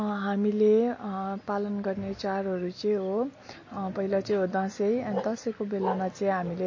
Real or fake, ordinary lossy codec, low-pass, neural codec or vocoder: real; MP3, 32 kbps; 7.2 kHz; none